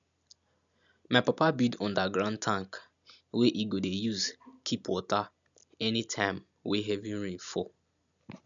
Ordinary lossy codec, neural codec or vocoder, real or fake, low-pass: none; none; real; 7.2 kHz